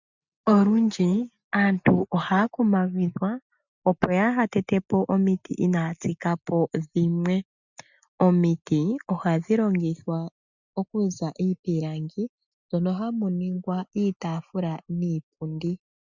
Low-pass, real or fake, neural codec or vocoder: 7.2 kHz; real; none